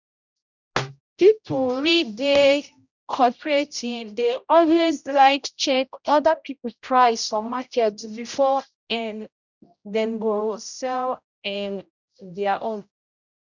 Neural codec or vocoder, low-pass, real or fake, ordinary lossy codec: codec, 16 kHz, 0.5 kbps, X-Codec, HuBERT features, trained on general audio; 7.2 kHz; fake; none